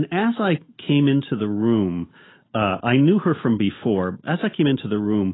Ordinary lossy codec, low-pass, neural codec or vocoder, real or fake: AAC, 16 kbps; 7.2 kHz; none; real